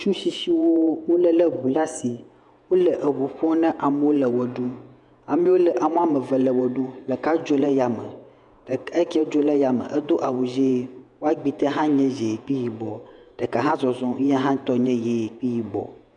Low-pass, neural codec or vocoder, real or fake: 10.8 kHz; vocoder, 48 kHz, 128 mel bands, Vocos; fake